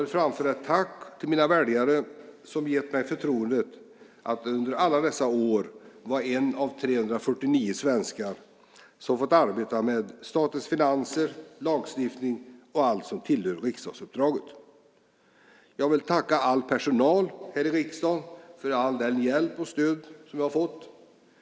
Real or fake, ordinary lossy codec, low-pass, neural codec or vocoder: real; none; none; none